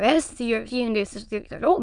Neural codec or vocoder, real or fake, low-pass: autoencoder, 22.05 kHz, a latent of 192 numbers a frame, VITS, trained on many speakers; fake; 9.9 kHz